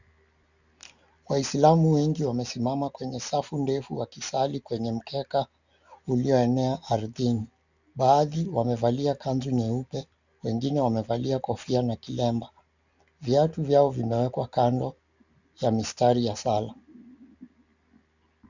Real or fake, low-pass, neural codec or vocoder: real; 7.2 kHz; none